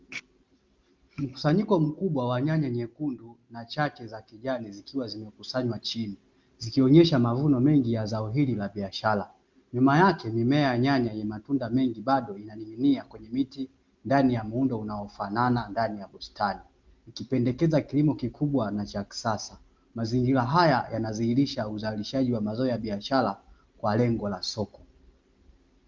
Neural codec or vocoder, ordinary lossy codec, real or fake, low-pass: none; Opus, 32 kbps; real; 7.2 kHz